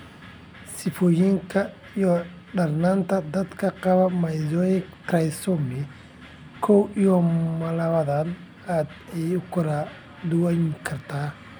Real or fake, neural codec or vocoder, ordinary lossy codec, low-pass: real; none; none; none